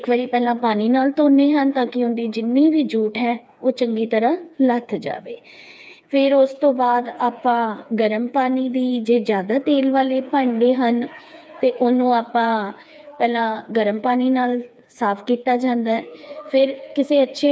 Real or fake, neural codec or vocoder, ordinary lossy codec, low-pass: fake; codec, 16 kHz, 4 kbps, FreqCodec, smaller model; none; none